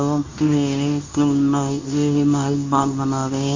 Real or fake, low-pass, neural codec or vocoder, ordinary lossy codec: fake; 7.2 kHz; codec, 24 kHz, 0.9 kbps, WavTokenizer, medium speech release version 2; AAC, 48 kbps